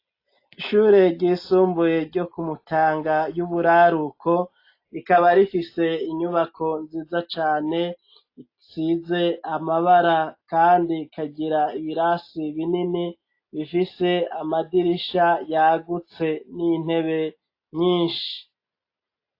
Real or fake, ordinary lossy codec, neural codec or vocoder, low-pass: real; AAC, 32 kbps; none; 5.4 kHz